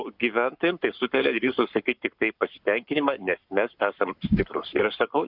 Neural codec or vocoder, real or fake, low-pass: codec, 44.1 kHz, 7.8 kbps, Pupu-Codec; fake; 5.4 kHz